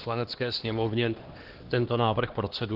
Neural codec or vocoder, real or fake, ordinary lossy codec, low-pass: codec, 16 kHz, 2 kbps, X-Codec, HuBERT features, trained on LibriSpeech; fake; Opus, 16 kbps; 5.4 kHz